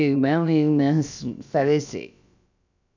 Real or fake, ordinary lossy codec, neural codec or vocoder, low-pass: fake; none; codec, 16 kHz, about 1 kbps, DyCAST, with the encoder's durations; 7.2 kHz